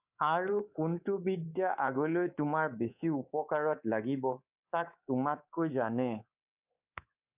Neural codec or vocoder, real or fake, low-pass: codec, 24 kHz, 3.1 kbps, DualCodec; fake; 3.6 kHz